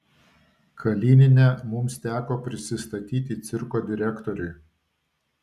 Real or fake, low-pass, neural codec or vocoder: real; 14.4 kHz; none